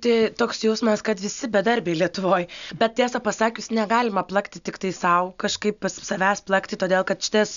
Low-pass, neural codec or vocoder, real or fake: 7.2 kHz; none; real